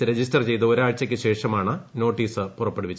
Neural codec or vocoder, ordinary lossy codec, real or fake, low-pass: none; none; real; none